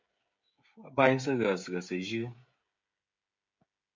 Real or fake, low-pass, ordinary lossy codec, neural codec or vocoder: fake; 7.2 kHz; MP3, 48 kbps; codec, 16 kHz, 16 kbps, FreqCodec, smaller model